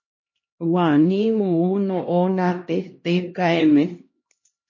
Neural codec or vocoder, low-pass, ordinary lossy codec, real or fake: codec, 16 kHz, 1 kbps, X-Codec, HuBERT features, trained on LibriSpeech; 7.2 kHz; MP3, 32 kbps; fake